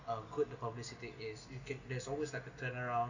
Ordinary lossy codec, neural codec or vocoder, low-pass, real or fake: none; none; 7.2 kHz; real